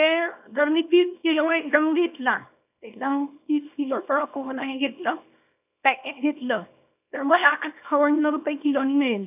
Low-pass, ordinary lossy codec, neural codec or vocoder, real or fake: 3.6 kHz; none; codec, 24 kHz, 0.9 kbps, WavTokenizer, small release; fake